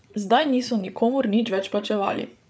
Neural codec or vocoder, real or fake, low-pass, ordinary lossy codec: codec, 16 kHz, 8 kbps, FreqCodec, larger model; fake; none; none